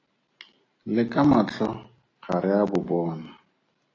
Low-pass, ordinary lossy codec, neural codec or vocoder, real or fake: 7.2 kHz; AAC, 32 kbps; none; real